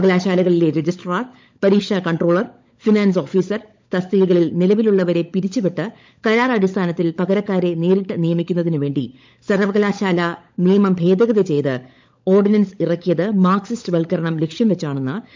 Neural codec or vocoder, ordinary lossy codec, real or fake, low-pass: codec, 16 kHz, 16 kbps, FunCodec, trained on LibriTTS, 50 frames a second; MP3, 64 kbps; fake; 7.2 kHz